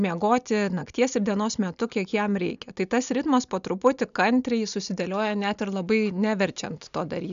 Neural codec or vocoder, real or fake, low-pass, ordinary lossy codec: none; real; 7.2 kHz; MP3, 96 kbps